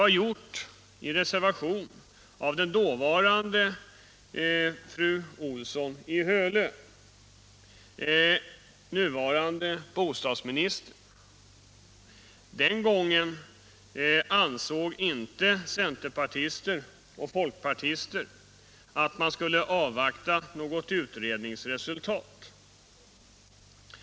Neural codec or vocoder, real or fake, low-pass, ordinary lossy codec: none; real; none; none